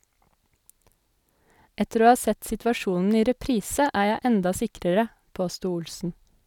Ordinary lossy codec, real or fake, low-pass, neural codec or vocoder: none; real; 19.8 kHz; none